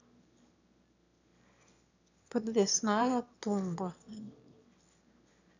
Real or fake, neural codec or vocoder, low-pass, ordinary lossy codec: fake; autoencoder, 22.05 kHz, a latent of 192 numbers a frame, VITS, trained on one speaker; 7.2 kHz; none